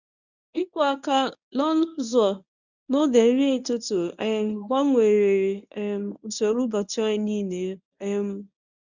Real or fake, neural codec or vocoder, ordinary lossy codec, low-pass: fake; codec, 24 kHz, 0.9 kbps, WavTokenizer, medium speech release version 1; none; 7.2 kHz